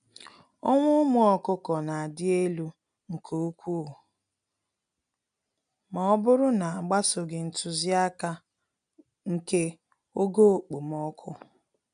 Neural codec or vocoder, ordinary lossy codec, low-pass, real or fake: none; none; 9.9 kHz; real